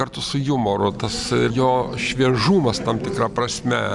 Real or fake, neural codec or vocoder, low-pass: real; none; 10.8 kHz